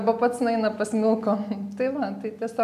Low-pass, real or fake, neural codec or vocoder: 14.4 kHz; real; none